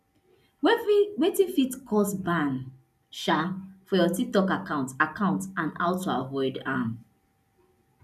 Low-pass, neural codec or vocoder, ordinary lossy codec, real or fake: 14.4 kHz; none; none; real